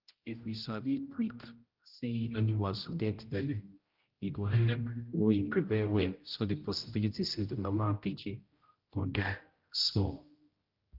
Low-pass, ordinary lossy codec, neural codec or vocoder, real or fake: 5.4 kHz; Opus, 32 kbps; codec, 16 kHz, 0.5 kbps, X-Codec, HuBERT features, trained on general audio; fake